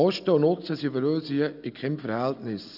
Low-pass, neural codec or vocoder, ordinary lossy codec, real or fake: 5.4 kHz; none; none; real